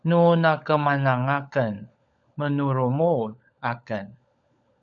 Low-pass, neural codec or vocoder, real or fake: 7.2 kHz; codec, 16 kHz, 16 kbps, FunCodec, trained on LibriTTS, 50 frames a second; fake